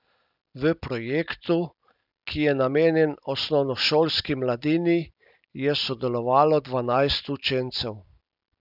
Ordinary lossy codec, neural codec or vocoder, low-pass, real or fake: none; none; 5.4 kHz; real